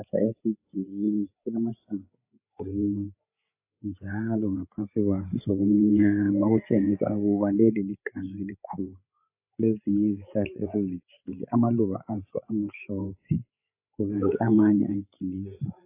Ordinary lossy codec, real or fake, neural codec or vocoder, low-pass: AAC, 32 kbps; fake; codec, 16 kHz, 8 kbps, FreqCodec, larger model; 3.6 kHz